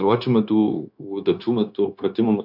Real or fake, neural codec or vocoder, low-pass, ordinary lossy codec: fake; codec, 16 kHz, 0.9 kbps, LongCat-Audio-Codec; 5.4 kHz; AAC, 48 kbps